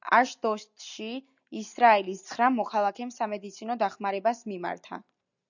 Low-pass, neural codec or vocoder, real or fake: 7.2 kHz; none; real